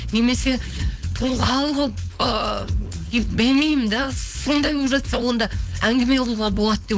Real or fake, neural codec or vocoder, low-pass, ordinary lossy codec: fake; codec, 16 kHz, 4.8 kbps, FACodec; none; none